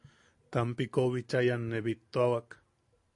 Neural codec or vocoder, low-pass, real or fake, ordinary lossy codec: none; 10.8 kHz; real; AAC, 64 kbps